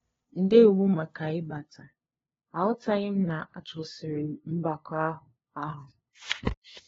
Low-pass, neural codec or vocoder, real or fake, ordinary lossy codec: 7.2 kHz; codec, 16 kHz, 2 kbps, FunCodec, trained on LibriTTS, 25 frames a second; fake; AAC, 24 kbps